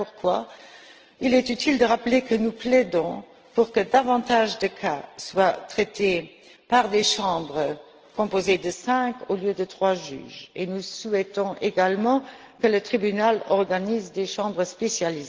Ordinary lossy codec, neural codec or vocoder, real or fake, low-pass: Opus, 16 kbps; none; real; 7.2 kHz